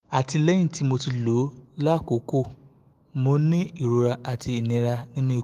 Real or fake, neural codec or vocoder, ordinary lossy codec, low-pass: fake; autoencoder, 48 kHz, 128 numbers a frame, DAC-VAE, trained on Japanese speech; Opus, 24 kbps; 14.4 kHz